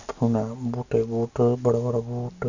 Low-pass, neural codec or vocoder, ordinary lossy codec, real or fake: 7.2 kHz; none; none; real